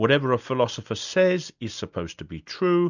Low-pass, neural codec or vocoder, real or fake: 7.2 kHz; none; real